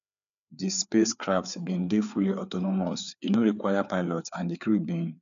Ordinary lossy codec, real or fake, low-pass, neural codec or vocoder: none; fake; 7.2 kHz; codec, 16 kHz, 4 kbps, FreqCodec, larger model